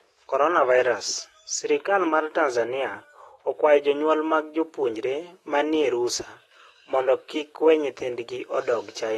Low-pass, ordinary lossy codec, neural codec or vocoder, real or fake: 19.8 kHz; AAC, 32 kbps; autoencoder, 48 kHz, 128 numbers a frame, DAC-VAE, trained on Japanese speech; fake